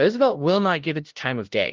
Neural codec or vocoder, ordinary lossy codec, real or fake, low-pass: codec, 16 kHz, 0.5 kbps, FunCodec, trained on LibriTTS, 25 frames a second; Opus, 16 kbps; fake; 7.2 kHz